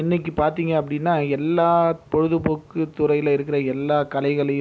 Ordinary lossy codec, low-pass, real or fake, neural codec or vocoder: none; none; real; none